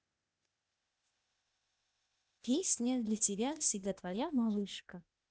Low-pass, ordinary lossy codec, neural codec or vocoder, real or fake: none; none; codec, 16 kHz, 0.8 kbps, ZipCodec; fake